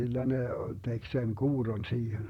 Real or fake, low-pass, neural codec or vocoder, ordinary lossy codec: fake; 19.8 kHz; vocoder, 44.1 kHz, 128 mel bands, Pupu-Vocoder; none